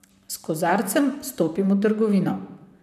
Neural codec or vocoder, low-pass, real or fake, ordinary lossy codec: vocoder, 44.1 kHz, 128 mel bands, Pupu-Vocoder; 14.4 kHz; fake; none